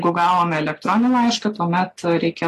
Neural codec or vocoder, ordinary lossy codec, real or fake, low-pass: none; AAC, 48 kbps; real; 14.4 kHz